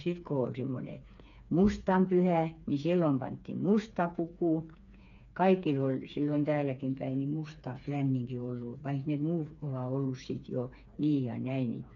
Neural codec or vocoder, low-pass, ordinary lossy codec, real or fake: codec, 16 kHz, 4 kbps, FreqCodec, smaller model; 7.2 kHz; none; fake